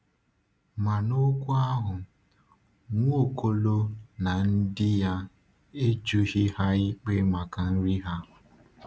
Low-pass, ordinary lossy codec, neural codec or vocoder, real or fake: none; none; none; real